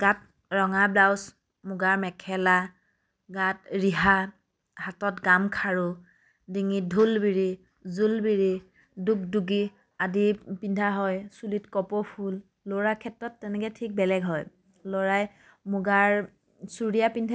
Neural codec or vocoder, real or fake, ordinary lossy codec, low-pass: none; real; none; none